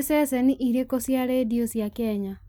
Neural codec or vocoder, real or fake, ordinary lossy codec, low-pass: none; real; none; none